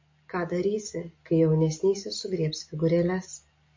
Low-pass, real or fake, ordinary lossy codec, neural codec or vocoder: 7.2 kHz; real; MP3, 32 kbps; none